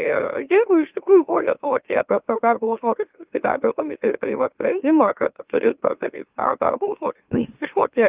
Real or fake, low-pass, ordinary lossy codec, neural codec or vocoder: fake; 3.6 kHz; Opus, 24 kbps; autoencoder, 44.1 kHz, a latent of 192 numbers a frame, MeloTTS